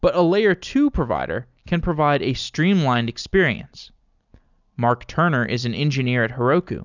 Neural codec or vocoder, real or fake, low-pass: none; real; 7.2 kHz